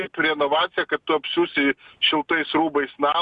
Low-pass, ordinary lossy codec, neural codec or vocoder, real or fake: 10.8 kHz; Opus, 64 kbps; none; real